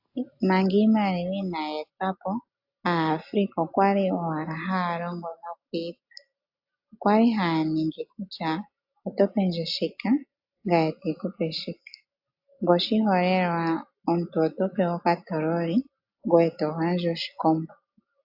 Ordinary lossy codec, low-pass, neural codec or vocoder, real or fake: AAC, 48 kbps; 5.4 kHz; none; real